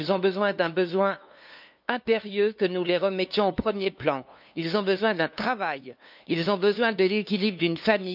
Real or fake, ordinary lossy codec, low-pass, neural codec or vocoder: fake; none; 5.4 kHz; codec, 16 kHz, 2 kbps, FunCodec, trained on LibriTTS, 25 frames a second